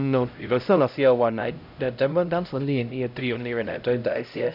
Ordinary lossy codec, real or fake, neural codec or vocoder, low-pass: none; fake; codec, 16 kHz, 0.5 kbps, X-Codec, HuBERT features, trained on LibriSpeech; 5.4 kHz